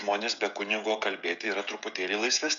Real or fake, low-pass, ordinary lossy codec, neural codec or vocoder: fake; 7.2 kHz; MP3, 64 kbps; codec, 16 kHz, 16 kbps, FreqCodec, smaller model